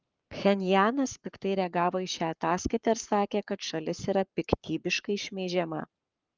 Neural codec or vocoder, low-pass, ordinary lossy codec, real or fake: codec, 44.1 kHz, 7.8 kbps, Pupu-Codec; 7.2 kHz; Opus, 24 kbps; fake